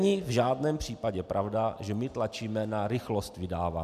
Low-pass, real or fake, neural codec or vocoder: 14.4 kHz; real; none